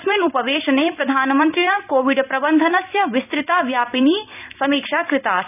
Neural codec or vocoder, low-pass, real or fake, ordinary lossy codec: vocoder, 44.1 kHz, 128 mel bands every 256 samples, BigVGAN v2; 3.6 kHz; fake; none